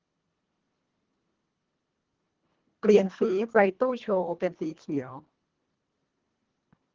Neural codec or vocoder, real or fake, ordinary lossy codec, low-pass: codec, 24 kHz, 1.5 kbps, HILCodec; fake; Opus, 16 kbps; 7.2 kHz